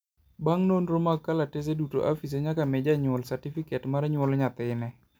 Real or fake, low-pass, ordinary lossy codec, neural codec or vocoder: real; none; none; none